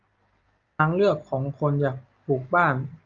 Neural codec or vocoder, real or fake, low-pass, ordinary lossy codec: none; real; 7.2 kHz; Opus, 32 kbps